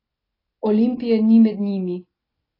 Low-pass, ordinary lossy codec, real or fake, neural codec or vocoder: 5.4 kHz; AAC, 24 kbps; real; none